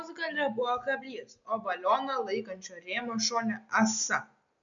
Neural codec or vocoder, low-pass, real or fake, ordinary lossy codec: none; 7.2 kHz; real; MP3, 64 kbps